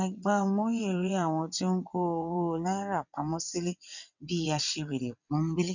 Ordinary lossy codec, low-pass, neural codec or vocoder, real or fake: none; 7.2 kHz; vocoder, 22.05 kHz, 80 mel bands, WaveNeXt; fake